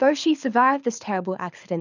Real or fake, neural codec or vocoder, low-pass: fake; codec, 24 kHz, 6 kbps, HILCodec; 7.2 kHz